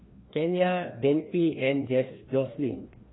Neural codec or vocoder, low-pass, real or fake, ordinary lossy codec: codec, 16 kHz, 1 kbps, FreqCodec, larger model; 7.2 kHz; fake; AAC, 16 kbps